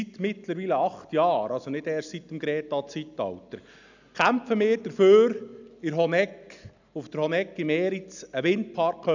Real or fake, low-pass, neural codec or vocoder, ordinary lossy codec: real; 7.2 kHz; none; none